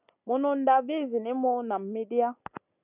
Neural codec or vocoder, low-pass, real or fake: none; 3.6 kHz; real